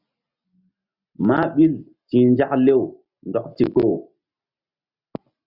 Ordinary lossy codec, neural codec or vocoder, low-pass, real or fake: Opus, 64 kbps; none; 5.4 kHz; real